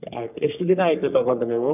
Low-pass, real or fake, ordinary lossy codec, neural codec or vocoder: 3.6 kHz; fake; none; codec, 44.1 kHz, 3.4 kbps, Pupu-Codec